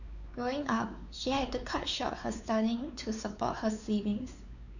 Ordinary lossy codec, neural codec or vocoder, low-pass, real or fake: none; codec, 16 kHz, 4 kbps, X-Codec, WavLM features, trained on Multilingual LibriSpeech; 7.2 kHz; fake